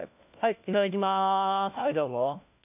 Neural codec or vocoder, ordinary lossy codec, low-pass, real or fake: codec, 16 kHz, 1 kbps, FunCodec, trained on LibriTTS, 50 frames a second; none; 3.6 kHz; fake